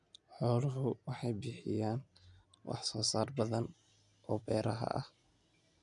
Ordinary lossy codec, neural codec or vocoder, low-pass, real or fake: none; none; 10.8 kHz; real